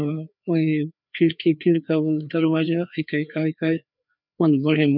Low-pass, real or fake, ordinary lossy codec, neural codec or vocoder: 5.4 kHz; fake; none; codec, 16 kHz, 2 kbps, FreqCodec, larger model